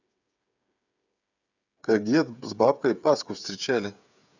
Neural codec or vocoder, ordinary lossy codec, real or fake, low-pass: codec, 16 kHz, 8 kbps, FreqCodec, smaller model; none; fake; 7.2 kHz